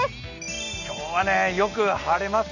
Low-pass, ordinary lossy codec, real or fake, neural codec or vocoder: 7.2 kHz; none; real; none